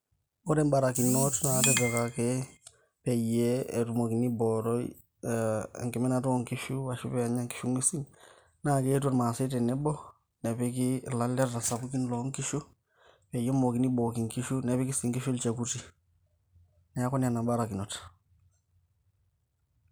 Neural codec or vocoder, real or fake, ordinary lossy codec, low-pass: none; real; none; none